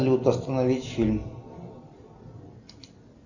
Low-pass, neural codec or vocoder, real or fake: 7.2 kHz; none; real